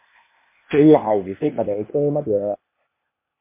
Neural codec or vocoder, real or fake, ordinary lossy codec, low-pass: codec, 16 kHz, 0.8 kbps, ZipCodec; fake; MP3, 24 kbps; 3.6 kHz